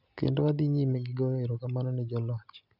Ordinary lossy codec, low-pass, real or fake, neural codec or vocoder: none; 5.4 kHz; real; none